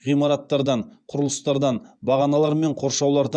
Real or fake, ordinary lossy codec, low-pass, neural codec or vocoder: fake; none; 9.9 kHz; vocoder, 24 kHz, 100 mel bands, Vocos